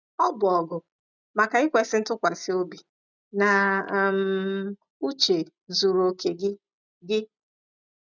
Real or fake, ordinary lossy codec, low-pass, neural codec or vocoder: real; none; 7.2 kHz; none